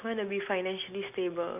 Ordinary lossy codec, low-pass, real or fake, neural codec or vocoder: none; 3.6 kHz; real; none